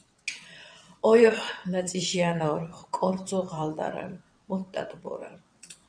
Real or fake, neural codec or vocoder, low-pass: fake; vocoder, 22.05 kHz, 80 mel bands, WaveNeXt; 9.9 kHz